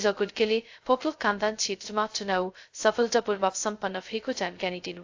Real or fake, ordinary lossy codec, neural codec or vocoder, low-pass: fake; AAC, 48 kbps; codec, 16 kHz, 0.2 kbps, FocalCodec; 7.2 kHz